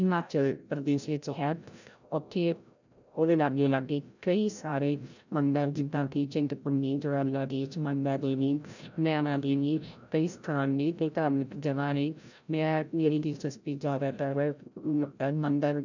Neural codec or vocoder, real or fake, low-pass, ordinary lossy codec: codec, 16 kHz, 0.5 kbps, FreqCodec, larger model; fake; 7.2 kHz; none